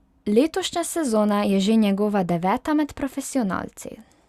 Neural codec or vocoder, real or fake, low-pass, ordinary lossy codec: none; real; 14.4 kHz; Opus, 64 kbps